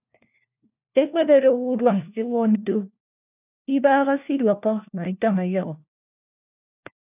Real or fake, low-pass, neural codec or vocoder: fake; 3.6 kHz; codec, 16 kHz, 1 kbps, FunCodec, trained on LibriTTS, 50 frames a second